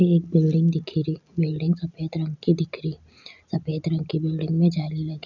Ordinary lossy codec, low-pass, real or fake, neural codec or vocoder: none; 7.2 kHz; real; none